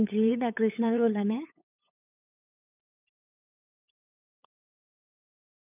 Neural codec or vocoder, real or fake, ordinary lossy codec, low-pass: codec, 16 kHz, 8 kbps, FunCodec, trained on LibriTTS, 25 frames a second; fake; none; 3.6 kHz